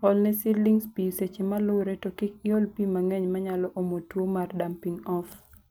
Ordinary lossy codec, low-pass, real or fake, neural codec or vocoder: none; none; real; none